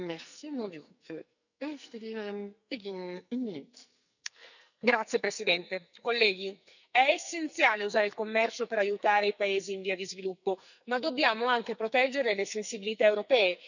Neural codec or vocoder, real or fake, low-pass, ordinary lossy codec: codec, 44.1 kHz, 2.6 kbps, SNAC; fake; 7.2 kHz; none